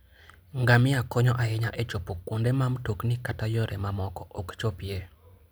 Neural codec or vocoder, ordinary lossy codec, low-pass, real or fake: none; none; none; real